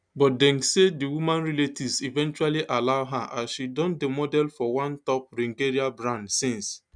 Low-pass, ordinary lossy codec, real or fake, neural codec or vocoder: 9.9 kHz; none; real; none